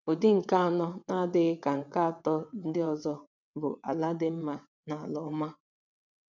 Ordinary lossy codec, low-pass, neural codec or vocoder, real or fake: none; 7.2 kHz; vocoder, 22.05 kHz, 80 mel bands, WaveNeXt; fake